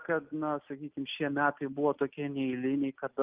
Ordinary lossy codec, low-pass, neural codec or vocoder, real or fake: Opus, 24 kbps; 3.6 kHz; none; real